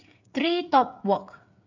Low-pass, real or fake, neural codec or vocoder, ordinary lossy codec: 7.2 kHz; fake; codec, 16 kHz, 8 kbps, FreqCodec, smaller model; none